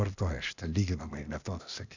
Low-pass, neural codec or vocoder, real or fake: 7.2 kHz; codec, 16 kHz, 0.8 kbps, ZipCodec; fake